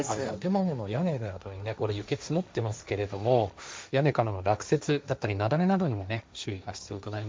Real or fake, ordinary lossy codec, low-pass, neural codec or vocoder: fake; none; none; codec, 16 kHz, 1.1 kbps, Voila-Tokenizer